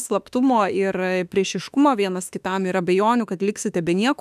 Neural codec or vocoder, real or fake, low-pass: autoencoder, 48 kHz, 32 numbers a frame, DAC-VAE, trained on Japanese speech; fake; 14.4 kHz